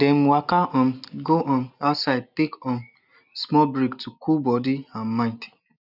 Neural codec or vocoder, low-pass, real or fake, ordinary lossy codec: none; 5.4 kHz; real; none